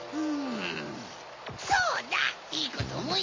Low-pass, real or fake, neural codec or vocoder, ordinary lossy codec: 7.2 kHz; real; none; MP3, 32 kbps